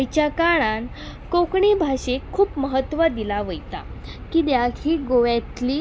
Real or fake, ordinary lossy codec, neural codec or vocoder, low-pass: real; none; none; none